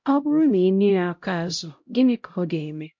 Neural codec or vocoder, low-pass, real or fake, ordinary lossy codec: codec, 16 kHz, 0.5 kbps, X-Codec, HuBERT features, trained on LibriSpeech; 7.2 kHz; fake; MP3, 48 kbps